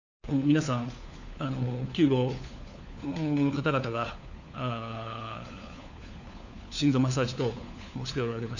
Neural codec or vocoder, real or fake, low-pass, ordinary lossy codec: codec, 16 kHz, 4 kbps, FunCodec, trained on LibriTTS, 50 frames a second; fake; 7.2 kHz; none